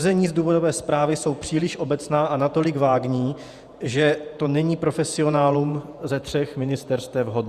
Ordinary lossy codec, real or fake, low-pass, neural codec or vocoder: Opus, 64 kbps; fake; 14.4 kHz; vocoder, 48 kHz, 128 mel bands, Vocos